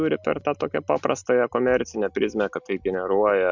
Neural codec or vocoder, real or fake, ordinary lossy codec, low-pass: none; real; MP3, 64 kbps; 7.2 kHz